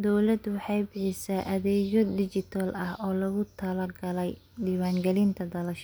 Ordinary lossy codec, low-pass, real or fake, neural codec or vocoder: none; none; real; none